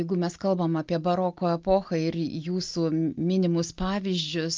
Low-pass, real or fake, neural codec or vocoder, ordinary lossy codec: 7.2 kHz; real; none; Opus, 24 kbps